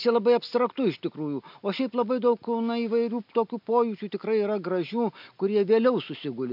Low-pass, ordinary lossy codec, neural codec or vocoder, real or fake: 5.4 kHz; MP3, 48 kbps; none; real